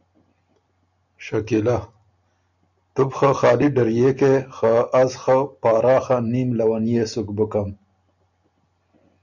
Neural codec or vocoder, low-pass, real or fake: none; 7.2 kHz; real